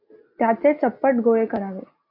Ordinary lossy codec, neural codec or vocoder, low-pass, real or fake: MP3, 32 kbps; none; 5.4 kHz; real